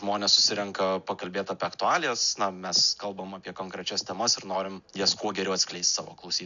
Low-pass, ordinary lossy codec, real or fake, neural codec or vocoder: 7.2 kHz; MP3, 96 kbps; real; none